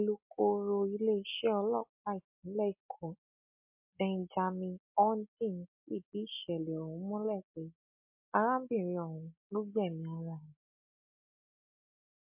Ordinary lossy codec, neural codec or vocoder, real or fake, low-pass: MP3, 32 kbps; none; real; 3.6 kHz